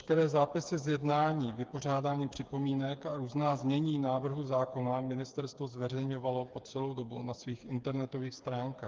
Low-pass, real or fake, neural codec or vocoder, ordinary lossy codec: 7.2 kHz; fake; codec, 16 kHz, 4 kbps, FreqCodec, smaller model; Opus, 24 kbps